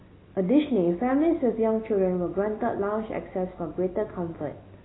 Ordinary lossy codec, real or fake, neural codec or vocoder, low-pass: AAC, 16 kbps; real; none; 7.2 kHz